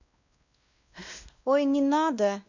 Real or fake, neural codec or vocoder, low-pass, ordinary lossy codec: fake; codec, 16 kHz, 1 kbps, X-Codec, WavLM features, trained on Multilingual LibriSpeech; 7.2 kHz; none